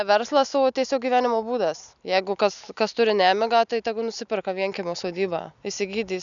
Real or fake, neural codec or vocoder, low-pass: real; none; 7.2 kHz